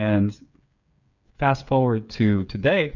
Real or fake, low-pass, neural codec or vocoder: fake; 7.2 kHz; codec, 16 kHz, 8 kbps, FreqCodec, smaller model